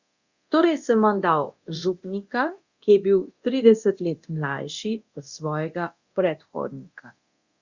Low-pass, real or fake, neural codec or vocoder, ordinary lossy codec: 7.2 kHz; fake; codec, 24 kHz, 0.9 kbps, DualCodec; Opus, 64 kbps